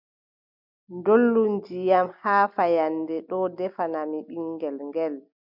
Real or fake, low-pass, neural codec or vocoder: real; 5.4 kHz; none